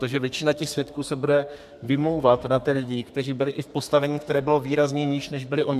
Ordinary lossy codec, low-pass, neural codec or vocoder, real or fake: MP3, 96 kbps; 14.4 kHz; codec, 32 kHz, 1.9 kbps, SNAC; fake